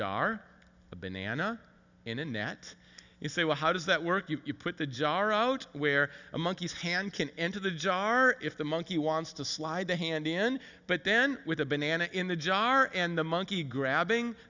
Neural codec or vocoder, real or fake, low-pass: none; real; 7.2 kHz